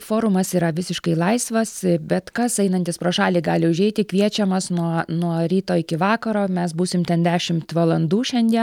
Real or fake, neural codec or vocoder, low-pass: real; none; 19.8 kHz